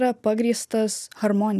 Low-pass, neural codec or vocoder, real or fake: 14.4 kHz; none; real